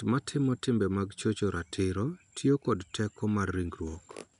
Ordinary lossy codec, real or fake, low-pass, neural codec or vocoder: none; real; 10.8 kHz; none